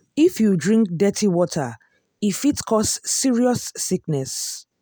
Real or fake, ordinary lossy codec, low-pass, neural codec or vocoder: real; none; none; none